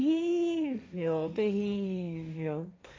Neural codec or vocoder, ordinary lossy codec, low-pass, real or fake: codec, 16 kHz, 1.1 kbps, Voila-Tokenizer; none; none; fake